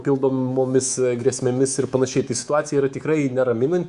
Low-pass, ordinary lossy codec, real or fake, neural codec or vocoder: 10.8 kHz; Opus, 64 kbps; fake; codec, 24 kHz, 3.1 kbps, DualCodec